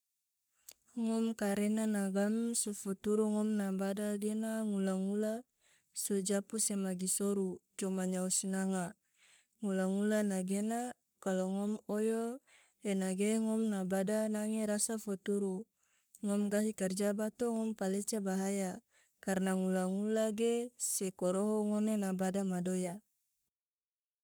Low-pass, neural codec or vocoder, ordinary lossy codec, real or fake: none; codec, 44.1 kHz, 3.4 kbps, Pupu-Codec; none; fake